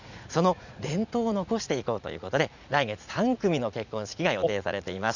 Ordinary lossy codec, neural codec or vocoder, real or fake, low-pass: none; vocoder, 44.1 kHz, 80 mel bands, Vocos; fake; 7.2 kHz